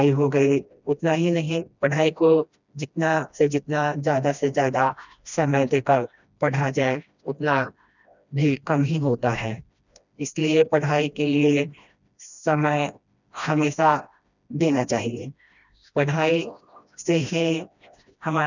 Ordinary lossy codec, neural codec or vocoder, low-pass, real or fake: none; codec, 16 kHz, 1 kbps, FreqCodec, smaller model; 7.2 kHz; fake